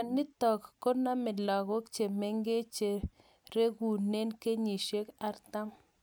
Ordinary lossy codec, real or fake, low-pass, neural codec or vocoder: none; real; none; none